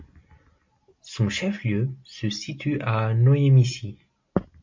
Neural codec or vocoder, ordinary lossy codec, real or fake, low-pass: none; MP3, 48 kbps; real; 7.2 kHz